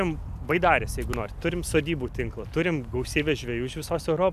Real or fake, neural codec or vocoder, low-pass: real; none; 14.4 kHz